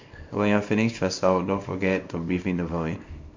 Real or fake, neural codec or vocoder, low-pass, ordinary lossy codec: fake; codec, 24 kHz, 0.9 kbps, WavTokenizer, small release; 7.2 kHz; MP3, 64 kbps